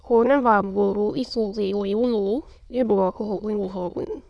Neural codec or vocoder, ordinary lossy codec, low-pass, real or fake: autoencoder, 22.05 kHz, a latent of 192 numbers a frame, VITS, trained on many speakers; none; none; fake